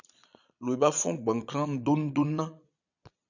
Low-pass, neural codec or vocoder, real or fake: 7.2 kHz; vocoder, 22.05 kHz, 80 mel bands, Vocos; fake